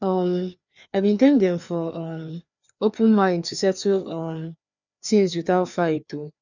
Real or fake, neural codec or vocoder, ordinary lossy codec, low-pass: fake; codec, 16 kHz, 2 kbps, FreqCodec, larger model; none; 7.2 kHz